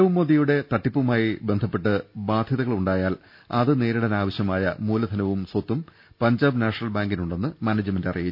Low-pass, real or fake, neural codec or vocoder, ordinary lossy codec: 5.4 kHz; real; none; none